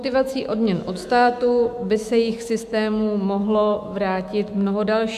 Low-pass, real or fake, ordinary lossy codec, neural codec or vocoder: 14.4 kHz; fake; AAC, 96 kbps; autoencoder, 48 kHz, 128 numbers a frame, DAC-VAE, trained on Japanese speech